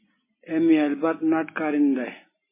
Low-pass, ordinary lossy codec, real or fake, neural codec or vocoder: 3.6 kHz; MP3, 16 kbps; real; none